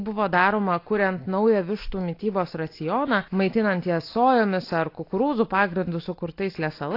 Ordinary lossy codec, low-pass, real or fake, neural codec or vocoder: AAC, 32 kbps; 5.4 kHz; real; none